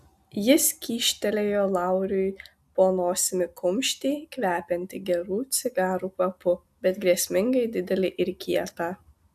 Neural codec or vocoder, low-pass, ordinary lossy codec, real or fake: none; 14.4 kHz; AAC, 96 kbps; real